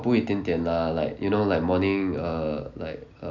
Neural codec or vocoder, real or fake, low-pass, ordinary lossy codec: none; real; 7.2 kHz; none